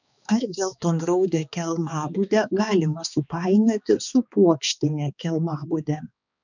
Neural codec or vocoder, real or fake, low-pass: codec, 16 kHz, 2 kbps, X-Codec, HuBERT features, trained on balanced general audio; fake; 7.2 kHz